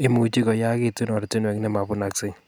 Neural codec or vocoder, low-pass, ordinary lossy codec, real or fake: none; none; none; real